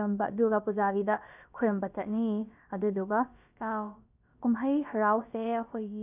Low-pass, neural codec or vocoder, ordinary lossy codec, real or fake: 3.6 kHz; codec, 16 kHz, about 1 kbps, DyCAST, with the encoder's durations; none; fake